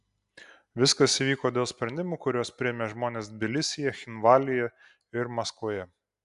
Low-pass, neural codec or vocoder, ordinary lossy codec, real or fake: 10.8 kHz; none; AAC, 96 kbps; real